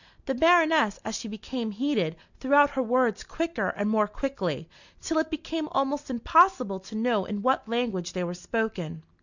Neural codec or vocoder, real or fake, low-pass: none; real; 7.2 kHz